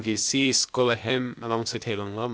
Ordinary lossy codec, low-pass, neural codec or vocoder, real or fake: none; none; codec, 16 kHz, 0.8 kbps, ZipCodec; fake